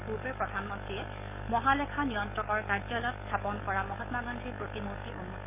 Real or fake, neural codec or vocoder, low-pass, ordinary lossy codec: fake; codec, 44.1 kHz, 7.8 kbps, DAC; 3.6 kHz; none